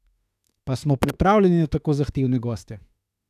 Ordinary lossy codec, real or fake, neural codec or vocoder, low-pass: none; fake; autoencoder, 48 kHz, 32 numbers a frame, DAC-VAE, trained on Japanese speech; 14.4 kHz